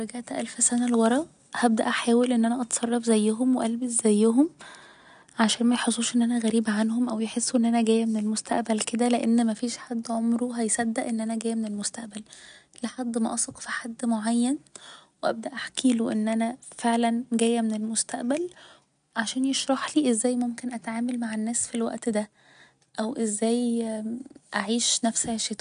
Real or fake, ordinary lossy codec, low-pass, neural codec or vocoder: real; none; 9.9 kHz; none